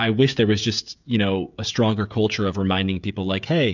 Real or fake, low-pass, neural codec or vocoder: fake; 7.2 kHz; codec, 16 kHz, 16 kbps, FreqCodec, smaller model